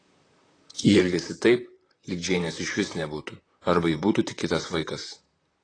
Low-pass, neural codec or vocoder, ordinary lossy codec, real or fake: 9.9 kHz; vocoder, 44.1 kHz, 128 mel bands, Pupu-Vocoder; AAC, 32 kbps; fake